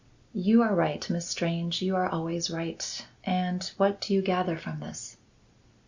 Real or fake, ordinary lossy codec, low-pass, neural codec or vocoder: real; Opus, 64 kbps; 7.2 kHz; none